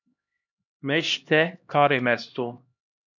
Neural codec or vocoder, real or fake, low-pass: codec, 16 kHz, 1 kbps, X-Codec, HuBERT features, trained on LibriSpeech; fake; 7.2 kHz